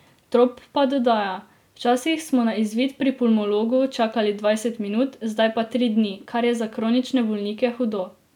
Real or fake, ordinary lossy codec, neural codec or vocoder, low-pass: real; none; none; 19.8 kHz